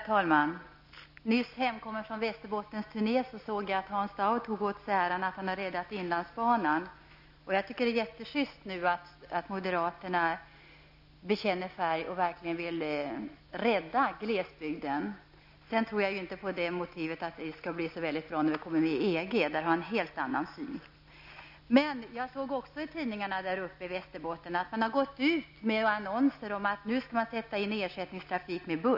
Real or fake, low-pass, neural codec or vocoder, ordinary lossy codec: real; 5.4 kHz; none; AAC, 48 kbps